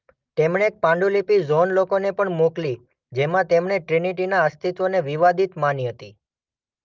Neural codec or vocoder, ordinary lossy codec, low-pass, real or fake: none; Opus, 24 kbps; 7.2 kHz; real